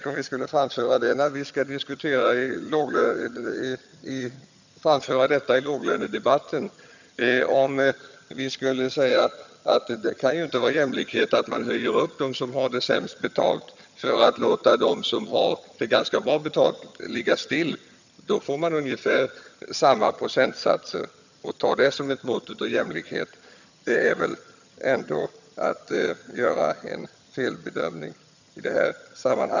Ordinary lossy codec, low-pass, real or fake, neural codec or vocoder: none; 7.2 kHz; fake; vocoder, 22.05 kHz, 80 mel bands, HiFi-GAN